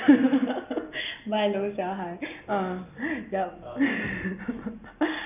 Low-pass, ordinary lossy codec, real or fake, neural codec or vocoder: 3.6 kHz; none; real; none